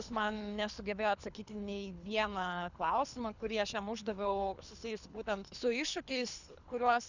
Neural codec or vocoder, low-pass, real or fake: codec, 24 kHz, 3 kbps, HILCodec; 7.2 kHz; fake